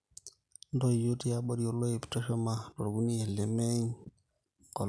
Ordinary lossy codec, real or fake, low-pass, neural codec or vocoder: none; real; none; none